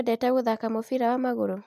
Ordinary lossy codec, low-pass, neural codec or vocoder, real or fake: AAC, 96 kbps; 14.4 kHz; none; real